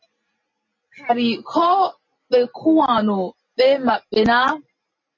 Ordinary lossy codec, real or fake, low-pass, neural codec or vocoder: MP3, 32 kbps; real; 7.2 kHz; none